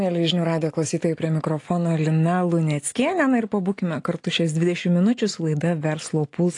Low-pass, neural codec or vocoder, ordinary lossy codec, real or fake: 10.8 kHz; none; AAC, 48 kbps; real